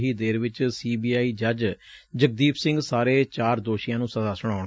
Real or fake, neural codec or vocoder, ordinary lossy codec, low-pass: real; none; none; none